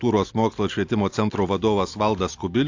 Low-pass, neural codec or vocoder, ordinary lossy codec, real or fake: 7.2 kHz; none; AAC, 48 kbps; real